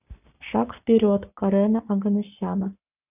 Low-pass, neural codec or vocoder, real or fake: 3.6 kHz; codec, 44.1 kHz, 7.8 kbps, Pupu-Codec; fake